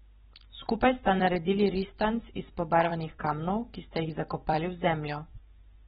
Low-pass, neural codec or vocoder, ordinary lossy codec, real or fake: 19.8 kHz; vocoder, 44.1 kHz, 128 mel bands every 256 samples, BigVGAN v2; AAC, 16 kbps; fake